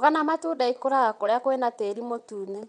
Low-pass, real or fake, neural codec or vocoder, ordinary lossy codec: 9.9 kHz; fake; vocoder, 22.05 kHz, 80 mel bands, WaveNeXt; none